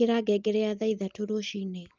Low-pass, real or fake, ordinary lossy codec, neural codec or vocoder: 7.2 kHz; real; Opus, 24 kbps; none